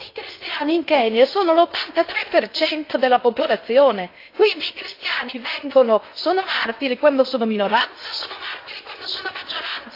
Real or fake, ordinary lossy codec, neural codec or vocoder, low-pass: fake; AAC, 32 kbps; codec, 16 kHz in and 24 kHz out, 0.6 kbps, FocalCodec, streaming, 2048 codes; 5.4 kHz